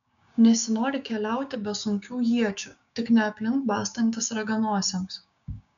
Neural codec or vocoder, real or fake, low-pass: codec, 16 kHz, 6 kbps, DAC; fake; 7.2 kHz